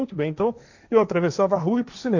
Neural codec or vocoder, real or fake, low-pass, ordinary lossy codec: codec, 16 kHz, 1.1 kbps, Voila-Tokenizer; fake; 7.2 kHz; none